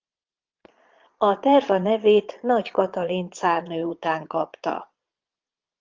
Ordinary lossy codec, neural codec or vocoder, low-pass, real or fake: Opus, 32 kbps; vocoder, 22.05 kHz, 80 mel bands, Vocos; 7.2 kHz; fake